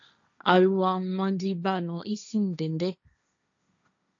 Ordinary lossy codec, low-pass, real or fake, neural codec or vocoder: AAC, 64 kbps; 7.2 kHz; fake; codec, 16 kHz, 1.1 kbps, Voila-Tokenizer